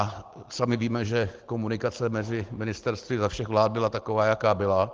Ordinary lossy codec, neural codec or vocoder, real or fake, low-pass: Opus, 16 kbps; none; real; 7.2 kHz